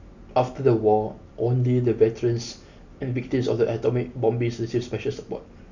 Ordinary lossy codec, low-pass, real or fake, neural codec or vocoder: none; 7.2 kHz; real; none